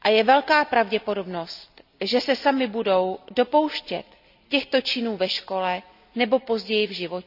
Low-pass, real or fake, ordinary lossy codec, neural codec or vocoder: 5.4 kHz; real; none; none